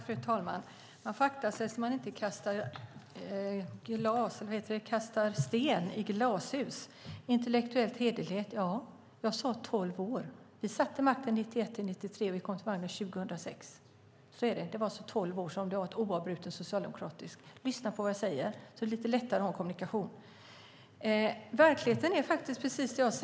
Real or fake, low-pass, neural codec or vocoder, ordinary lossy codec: real; none; none; none